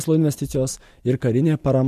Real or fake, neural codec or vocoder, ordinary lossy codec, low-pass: real; none; MP3, 64 kbps; 14.4 kHz